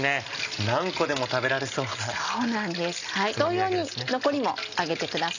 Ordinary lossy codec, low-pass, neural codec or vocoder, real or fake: none; 7.2 kHz; none; real